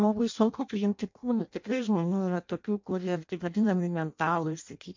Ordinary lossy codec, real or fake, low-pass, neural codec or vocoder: MP3, 48 kbps; fake; 7.2 kHz; codec, 16 kHz in and 24 kHz out, 0.6 kbps, FireRedTTS-2 codec